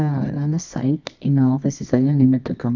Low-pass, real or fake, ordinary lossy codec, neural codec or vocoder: 7.2 kHz; fake; none; codec, 24 kHz, 0.9 kbps, WavTokenizer, medium music audio release